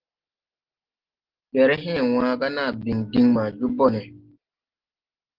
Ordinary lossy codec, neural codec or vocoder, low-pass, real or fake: Opus, 16 kbps; none; 5.4 kHz; real